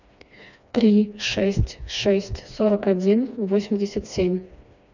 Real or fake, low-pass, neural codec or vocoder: fake; 7.2 kHz; codec, 16 kHz, 2 kbps, FreqCodec, smaller model